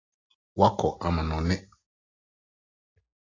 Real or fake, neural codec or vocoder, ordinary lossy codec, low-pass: real; none; AAC, 32 kbps; 7.2 kHz